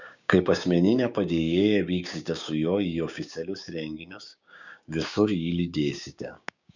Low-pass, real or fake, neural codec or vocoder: 7.2 kHz; fake; codec, 16 kHz, 6 kbps, DAC